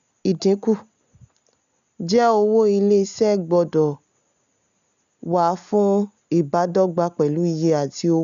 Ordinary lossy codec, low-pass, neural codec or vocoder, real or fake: none; 7.2 kHz; none; real